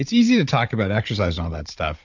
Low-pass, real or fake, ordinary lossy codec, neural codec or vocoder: 7.2 kHz; fake; MP3, 48 kbps; codec, 16 kHz, 16 kbps, FunCodec, trained on Chinese and English, 50 frames a second